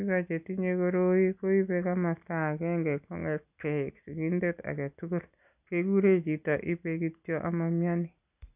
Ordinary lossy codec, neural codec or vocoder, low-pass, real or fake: none; none; 3.6 kHz; real